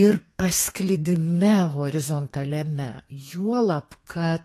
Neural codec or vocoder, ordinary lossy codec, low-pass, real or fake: codec, 32 kHz, 1.9 kbps, SNAC; AAC, 48 kbps; 14.4 kHz; fake